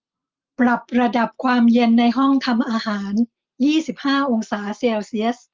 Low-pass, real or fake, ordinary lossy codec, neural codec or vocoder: 7.2 kHz; real; Opus, 24 kbps; none